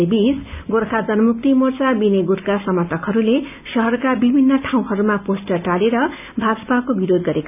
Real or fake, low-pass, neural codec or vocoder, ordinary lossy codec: real; 3.6 kHz; none; none